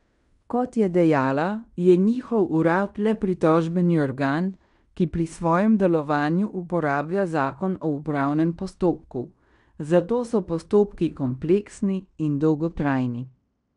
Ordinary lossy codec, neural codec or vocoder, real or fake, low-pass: none; codec, 16 kHz in and 24 kHz out, 0.9 kbps, LongCat-Audio-Codec, fine tuned four codebook decoder; fake; 10.8 kHz